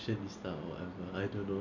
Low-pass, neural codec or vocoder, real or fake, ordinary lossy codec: 7.2 kHz; none; real; none